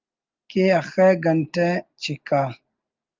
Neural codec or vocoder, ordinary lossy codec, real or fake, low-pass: none; Opus, 32 kbps; real; 7.2 kHz